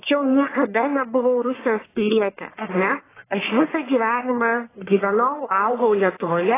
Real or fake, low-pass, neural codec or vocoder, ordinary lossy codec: fake; 3.6 kHz; codec, 44.1 kHz, 1.7 kbps, Pupu-Codec; AAC, 16 kbps